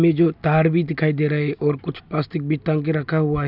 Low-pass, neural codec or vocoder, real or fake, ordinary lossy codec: 5.4 kHz; none; real; none